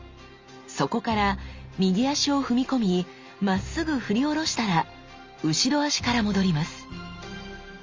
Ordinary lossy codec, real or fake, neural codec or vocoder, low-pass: Opus, 32 kbps; real; none; 7.2 kHz